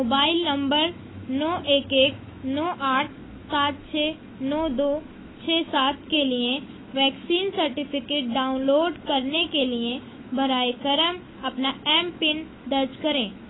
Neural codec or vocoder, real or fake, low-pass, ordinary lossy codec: autoencoder, 48 kHz, 128 numbers a frame, DAC-VAE, trained on Japanese speech; fake; 7.2 kHz; AAC, 16 kbps